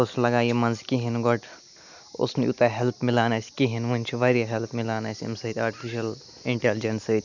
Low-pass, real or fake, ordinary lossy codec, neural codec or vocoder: 7.2 kHz; real; none; none